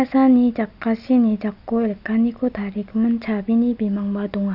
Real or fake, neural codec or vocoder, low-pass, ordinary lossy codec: real; none; 5.4 kHz; none